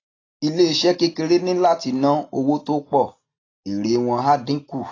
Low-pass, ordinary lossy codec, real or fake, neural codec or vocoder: 7.2 kHz; AAC, 32 kbps; real; none